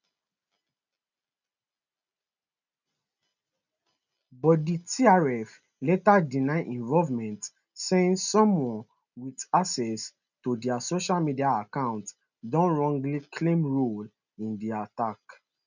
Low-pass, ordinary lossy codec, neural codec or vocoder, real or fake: 7.2 kHz; none; none; real